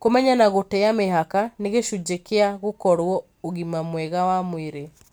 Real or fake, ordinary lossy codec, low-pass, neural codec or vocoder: real; none; none; none